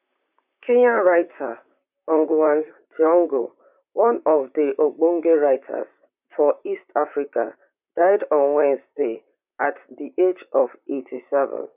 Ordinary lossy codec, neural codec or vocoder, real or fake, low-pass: none; vocoder, 44.1 kHz, 128 mel bands, Pupu-Vocoder; fake; 3.6 kHz